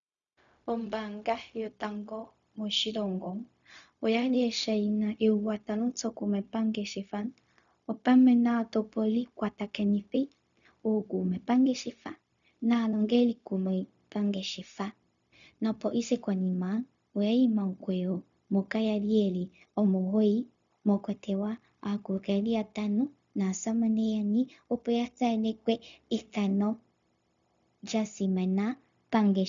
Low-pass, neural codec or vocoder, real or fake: 7.2 kHz; codec, 16 kHz, 0.4 kbps, LongCat-Audio-Codec; fake